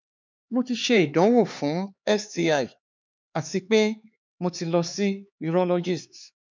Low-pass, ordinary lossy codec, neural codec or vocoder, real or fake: 7.2 kHz; MP3, 64 kbps; codec, 16 kHz, 4 kbps, X-Codec, HuBERT features, trained on LibriSpeech; fake